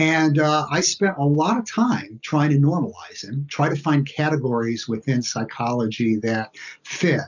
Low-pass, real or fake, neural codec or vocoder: 7.2 kHz; real; none